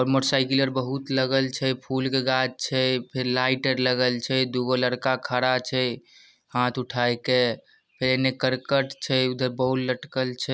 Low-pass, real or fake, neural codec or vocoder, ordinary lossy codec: none; real; none; none